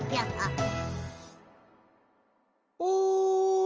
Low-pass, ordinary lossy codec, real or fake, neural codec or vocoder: 7.2 kHz; Opus, 24 kbps; real; none